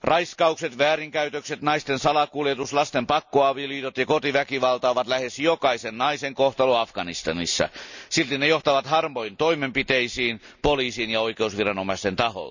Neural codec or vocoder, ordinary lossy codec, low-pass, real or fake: none; none; 7.2 kHz; real